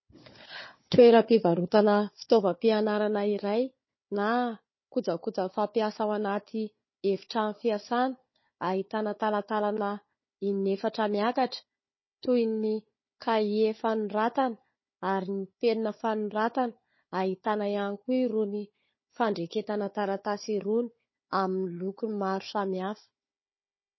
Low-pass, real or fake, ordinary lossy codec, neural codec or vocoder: 7.2 kHz; fake; MP3, 24 kbps; codec, 16 kHz, 4 kbps, FunCodec, trained on Chinese and English, 50 frames a second